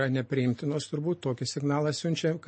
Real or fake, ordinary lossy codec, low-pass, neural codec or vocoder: real; MP3, 32 kbps; 9.9 kHz; none